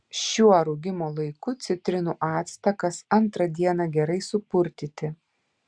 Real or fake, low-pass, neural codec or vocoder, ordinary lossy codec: real; 9.9 kHz; none; Opus, 64 kbps